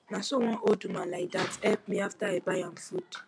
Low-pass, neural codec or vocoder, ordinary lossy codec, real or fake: 9.9 kHz; none; MP3, 64 kbps; real